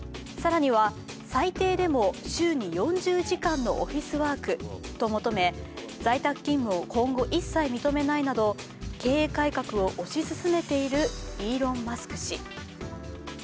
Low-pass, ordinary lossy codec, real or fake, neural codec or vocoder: none; none; real; none